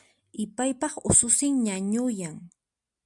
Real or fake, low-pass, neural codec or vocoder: real; 10.8 kHz; none